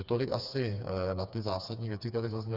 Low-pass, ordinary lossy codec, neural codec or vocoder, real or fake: 5.4 kHz; Opus, 64 kbps; codec, 16 kHz, 4 kbps, FreqCodec, smaller model; fake